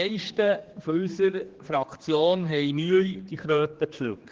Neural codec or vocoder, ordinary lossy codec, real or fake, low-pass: codec, 16 kHz, 2 kbps, X-Codec, HuBERT features, trained on general audio; Opus, 16 kbps; fake; 7.2 kHz